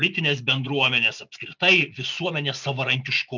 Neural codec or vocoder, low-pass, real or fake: none; 7.2 kHz; real